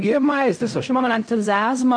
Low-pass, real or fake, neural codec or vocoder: 9.9 kHz; fake; codec, 16 kHz in and 24 kHz out, 0.4 kbps, LongCat-Audio-Codec, fine tuned four codebook decoder